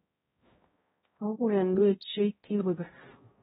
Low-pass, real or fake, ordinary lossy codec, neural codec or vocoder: 7.2 kHz; fake; AAC, 16 kbps; codec, 16 kHz, 0.5 kbps, X-Codec, HuBERT features, trained on balanced general audio